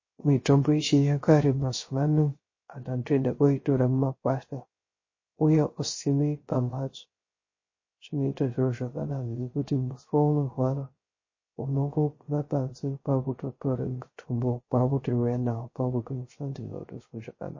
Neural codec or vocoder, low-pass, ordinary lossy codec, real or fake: codec, 16 kHz, 0.3 kbps, FocalCodec; 7.2 kHz; MP3, 32 kbps; fake